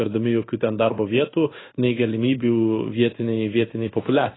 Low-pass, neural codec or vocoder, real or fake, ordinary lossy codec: 7.2 kHz; codec, 16 kHz in and 24 kHz out, 1 kbps, XY-Tokenizer; fake; AAC, 16 kbps